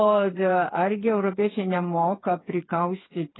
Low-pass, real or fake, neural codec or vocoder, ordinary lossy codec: 7.2 kHz; fake; codec, 16 kHz, 4 kbps, FreqCodec, smaller model; AAC, 16 kbps